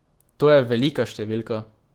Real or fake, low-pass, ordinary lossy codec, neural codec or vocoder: fake; 19.8 kHz; Opus, 16 kbps; autoencoder, 48 kHz, 128 numbers a frame, DAC-VAE, trained on Japanese speech